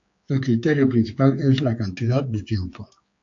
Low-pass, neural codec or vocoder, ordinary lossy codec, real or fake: 7.2 kHz; codec, 16 kHz, 4 kbps, X-Codec, HuBERT features, trained on general audio; AAC, 48 kbps; fake